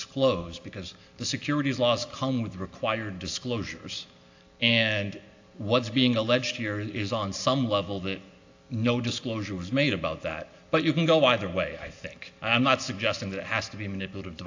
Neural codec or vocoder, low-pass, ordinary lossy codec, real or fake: none; 7.2 kHz; AAC, 48 kbps; real